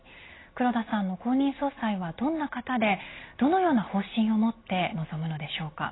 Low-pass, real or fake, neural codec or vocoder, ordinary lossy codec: 7.2 kHz; real; none; AAC, 16 kbps